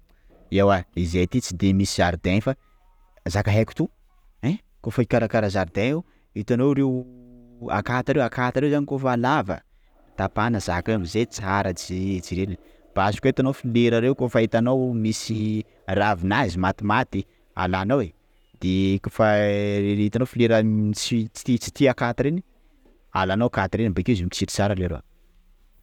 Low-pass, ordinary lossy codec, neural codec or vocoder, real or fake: 19.8 kHz; none; none; real